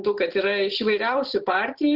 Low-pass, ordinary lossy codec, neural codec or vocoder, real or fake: 5.4 kHz; Opus, 16 kbps; none; real